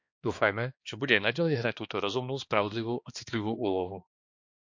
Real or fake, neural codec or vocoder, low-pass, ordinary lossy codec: fake; codec, 16 kHz, 2 kbps, X-Codec, HuBERT features, trained on balanced general audio; 7.2 kHz; MP3, 48 kbps